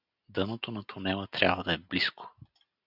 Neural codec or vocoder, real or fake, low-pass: none; real; 5.4 kHz